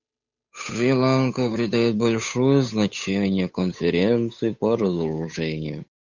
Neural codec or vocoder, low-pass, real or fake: codec, 16 kHz, 8 kbps, FunCodec, trained on Chinese and English, 25 frames a second; 7.2 kHz; fake